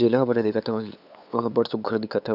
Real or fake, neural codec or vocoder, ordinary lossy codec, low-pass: fake; codec, 16 kHz, 8 kbps, FunCodec, trained on LibriTTS, 25 frames a second; none; 5.4 kHz